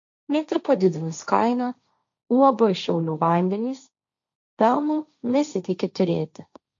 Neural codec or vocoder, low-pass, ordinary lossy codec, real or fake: codec, 16 kHz, 1.1 kbps, Voila-Tokenizer; 7.2 kHz; MP3, 64 kbps; fake